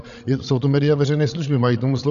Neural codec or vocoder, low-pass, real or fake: codec, 16 kHz, 16 kbps, FreqCodec, larger model; 7.2 kHz; fake